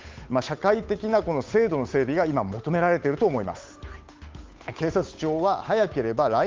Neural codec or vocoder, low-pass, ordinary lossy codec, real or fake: codec, 16 kHz, 8 kbps, FunCodec, trained on Chinese and English, 25 frames a second; 7.2 kHz; Opus, 24 kbps; fake